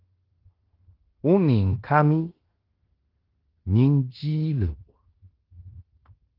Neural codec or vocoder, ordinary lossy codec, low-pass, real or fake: codec, 16 kHz in and 24 kHz out, 0.9 kbps, LongCat-Audio-Codec, fine tuned four codebook decoder; Opus, 16 kbps; 5.4 kHz; fake